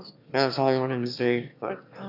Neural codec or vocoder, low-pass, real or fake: autoencoder, 22.05 kHz, a latent of 192 numbers a frame, VITS, trained on one speaker; 5.4 kHz; fake